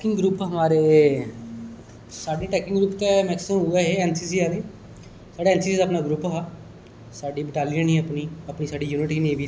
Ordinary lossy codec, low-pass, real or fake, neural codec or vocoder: none; none; real; none